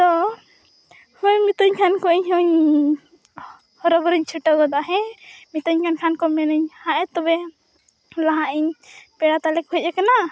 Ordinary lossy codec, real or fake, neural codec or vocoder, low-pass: none; real; none; none